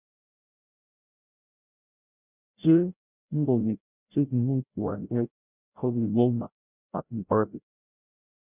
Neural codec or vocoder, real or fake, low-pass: codec, 16 kHz, 0.5 kbps, FreqCodec, larger model; fake; 3.6 kHz